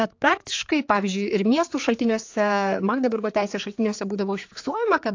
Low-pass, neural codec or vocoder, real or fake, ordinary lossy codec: 7.2 kHz; codec, 16 kHz, 4 kbps, X-Codec, HuBERT features, trained on general audio; fake; AAC, 48 kbps